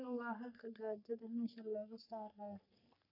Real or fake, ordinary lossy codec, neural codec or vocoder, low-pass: fake; none; codec, 44.1 kHz, 3.4 kbps, Pupu-Codec; 5.4 kHz